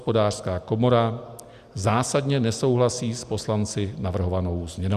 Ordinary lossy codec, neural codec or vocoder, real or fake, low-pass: Opus, 64 kbps; none; real; 14.4 kHz